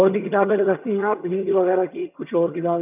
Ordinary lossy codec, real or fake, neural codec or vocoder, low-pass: none; fake; vocoder, 22.05 kHz, 80 mel bands, HiFi-GAN; 3.6 kHz